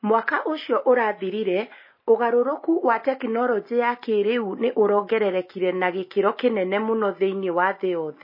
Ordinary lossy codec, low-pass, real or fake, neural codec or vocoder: MP3, 24 kbps; 5.4 kHz; real; none